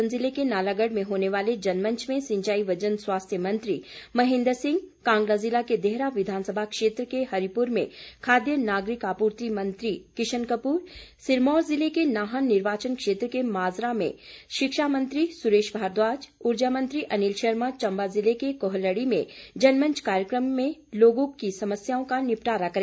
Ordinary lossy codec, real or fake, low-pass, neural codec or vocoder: none; real; none; none